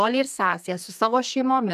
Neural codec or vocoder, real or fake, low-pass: codec, 32 kHz, 1.9 kbps, SNAC; fake; 14.4 kHz